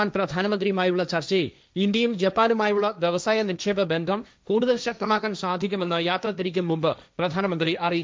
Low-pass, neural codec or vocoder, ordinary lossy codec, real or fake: none; codec, 16 kHz, 1.1 kbps, Voila-Tokenizer; none; fake